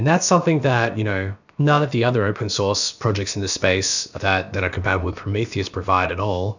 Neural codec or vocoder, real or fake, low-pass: codec, 16 kHz, about 1 kbps, DyCAST, with the encoder's durations; fake; 7.2 kHz